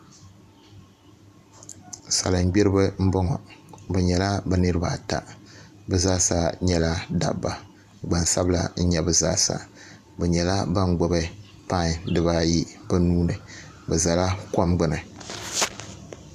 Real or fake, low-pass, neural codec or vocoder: fake; 14.4 kHz; vocoder, 44.1 kHz, 128 mel bands every 512 samples, BigVGAN v2